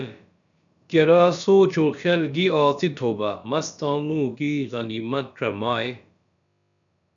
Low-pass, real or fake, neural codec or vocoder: 7.2 kHz; fake; codec, 16 kHz, about 1 kbps, DyCAST, with the encoder's durations